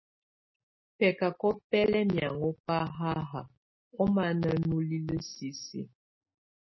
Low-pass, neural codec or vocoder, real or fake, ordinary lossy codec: 7.2 kHz; none; real; MP3, 24 kbps